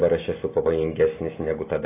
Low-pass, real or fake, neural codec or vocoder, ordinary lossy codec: 3.6 kHz; real; none; AAC, 16 kbps